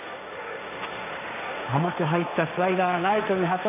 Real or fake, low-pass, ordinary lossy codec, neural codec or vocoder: fake; 3.6 kHz; none; codec, 16 kHz, 1.1 kbps, Voila-Tokenizer